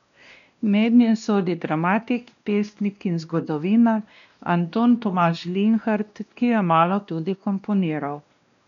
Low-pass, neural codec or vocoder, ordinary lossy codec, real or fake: 7.2 kHz; codec, 16 kHz, 1 kbps, X-Codec, WavLM features, trained on Multilingual LibriSpeech; none; fake